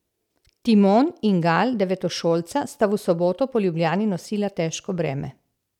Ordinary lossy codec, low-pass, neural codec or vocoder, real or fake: none; 19.8 kHz; none; real